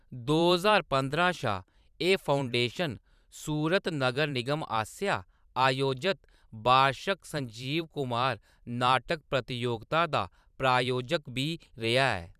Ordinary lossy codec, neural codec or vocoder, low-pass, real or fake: none; vocoder, 44.1 kHz, 128 mel bands every 512 samples, BigVGAN v2; 14.4 kHz; fake